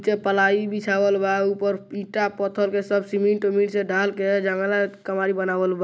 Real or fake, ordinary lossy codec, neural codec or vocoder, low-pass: real; none; none; none